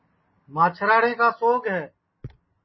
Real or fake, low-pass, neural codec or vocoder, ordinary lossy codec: real; 7.2 kHz; none; MP3, 24 kbps